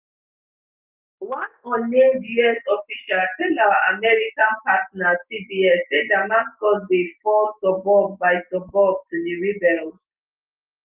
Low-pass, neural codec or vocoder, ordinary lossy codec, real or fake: 3.6 kHz; none; Opus, 32 kbps; real